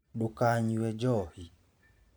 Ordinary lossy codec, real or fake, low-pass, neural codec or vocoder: none; fake; none; vocoder, 44.1 kHz, 128 mel bands every 512 samples, BigVGAN v2